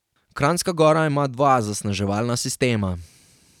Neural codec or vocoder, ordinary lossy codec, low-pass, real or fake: vocoder, 44.1 kHz, 128 mel bands every 256 samples, BigVGAN v2; none; 19.8 kHz; fake